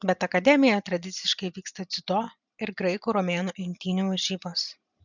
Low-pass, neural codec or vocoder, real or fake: 7.2 kHz; none; real